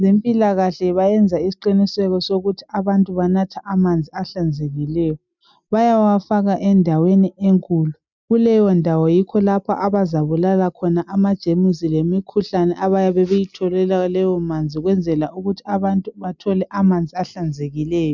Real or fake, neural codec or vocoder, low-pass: real; none; 7.2 kHz